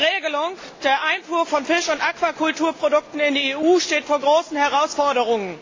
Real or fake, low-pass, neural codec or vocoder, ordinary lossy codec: real; 7.2 kHz; none; AAC, 32 kbps